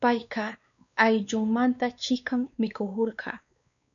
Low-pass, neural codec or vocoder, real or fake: 7.2 kHz; codec, 16 kHz, 2 kbps, X-Codec, WavLM features, trained on Multilingual LibriSpeech; fake